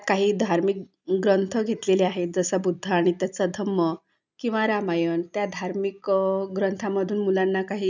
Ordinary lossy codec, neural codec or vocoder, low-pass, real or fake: none; none; 7.2 kHz; real